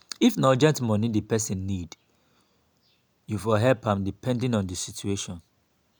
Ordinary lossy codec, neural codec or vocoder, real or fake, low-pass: none; none; real; none